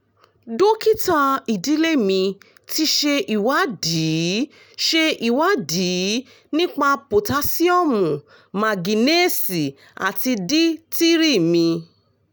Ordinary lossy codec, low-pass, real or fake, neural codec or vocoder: none; none; real; none